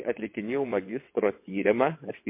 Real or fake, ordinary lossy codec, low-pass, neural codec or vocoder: fake; MP3, 24 kbps; 3.6 kHz; vocoder, 22.05 kHz, 80 mel bands, WaveNeXt